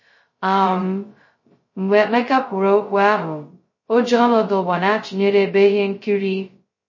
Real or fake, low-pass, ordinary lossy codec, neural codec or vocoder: fake; 7.2 kHz; MP3, 32 kbps; codec, 16 kHz, 0.2 kbps, FocalCodec